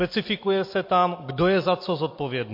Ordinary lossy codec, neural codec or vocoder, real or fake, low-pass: MP3, 32 kbps; none; real; 5.4 kHz